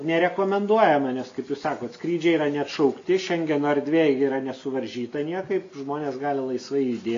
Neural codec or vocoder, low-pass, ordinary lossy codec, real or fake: none; 7.2 kHz; AAC, 48 kbps; real